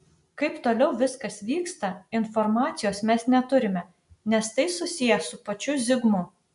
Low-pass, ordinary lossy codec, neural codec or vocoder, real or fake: 10.8 kHz; AAC, 96 kbps; none; real